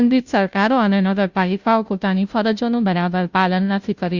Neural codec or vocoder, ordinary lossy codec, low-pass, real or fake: codec, 16 kHz, 0.5 kbps, FunCodec, trained on Chinese and English, 25 frames a second; Opus, 64 kbps; 7.2 kHz; fake